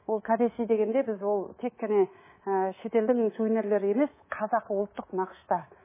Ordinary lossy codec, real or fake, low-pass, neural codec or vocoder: MP3, 16 kbps; fake; 3.6 kHz; codec, 24 kHz, 3.1 kbps, DualCodec